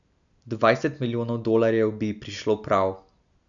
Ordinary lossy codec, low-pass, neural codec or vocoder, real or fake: none; 7.2 kHz; none; real